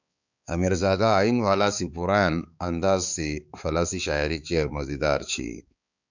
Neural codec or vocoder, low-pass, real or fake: codec, 16 kHz, 4 kbps, X-Codec, HuBERT features, trained on balanced general audio; 7.2 kHz; fake